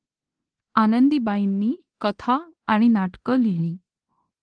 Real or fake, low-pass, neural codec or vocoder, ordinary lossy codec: fake; 9.9 kHz; codec, 24 kHz, 0.9 kbps, DualCodec; Opus, 16 kbps